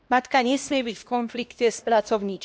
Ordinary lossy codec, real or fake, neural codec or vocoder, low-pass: none; fake; codec, 16 kHz, 1 kbps, X-Codec, HuBERT features, trained on LibriSpeech; none